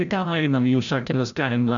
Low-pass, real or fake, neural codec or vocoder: 7.2 kHz; fake; codec, 16 kHz, 0.5 kbps, FreqCodec, larger model